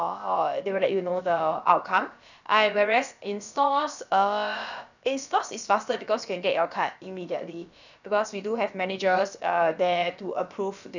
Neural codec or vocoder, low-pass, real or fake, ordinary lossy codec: codec, 16 kHz, about 1 kbps, DyCAST, with the encoder's durations; 7.2 kHz; fake; none